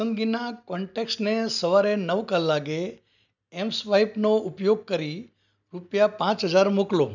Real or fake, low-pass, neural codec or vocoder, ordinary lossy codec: real; 7.2 kHz; none; none